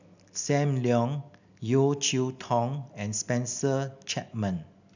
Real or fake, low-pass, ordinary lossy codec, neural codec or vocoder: real; 7.2 kHz; none; none